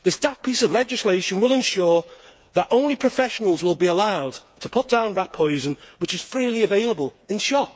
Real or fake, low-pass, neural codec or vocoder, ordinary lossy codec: fake; none; codec, 16 kHz, 4 kbps, FreqCodec, smaller model; none